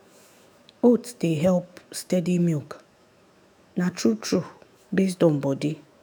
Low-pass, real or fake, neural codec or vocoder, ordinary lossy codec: none; fake; autoencoder, 48 kHz, 128 numbers a frame, DAC-VAE, trained on Japanese speech; none